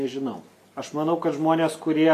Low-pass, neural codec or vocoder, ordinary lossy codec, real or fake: 14.4 kHz; none; MP3, 96 kbps; real